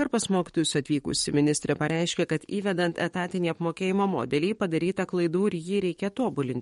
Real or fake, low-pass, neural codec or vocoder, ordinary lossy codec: fake; 19.8 kHz; codec, 44.1 kHz, 7.8 kbps, DAC; MP3, 48 kbps